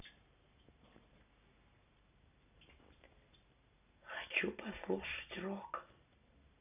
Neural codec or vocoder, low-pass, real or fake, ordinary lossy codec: none; 3.6 kHz; real; none